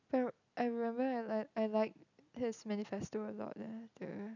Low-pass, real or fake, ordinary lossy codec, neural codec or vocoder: 7.2 kHz; real; none; none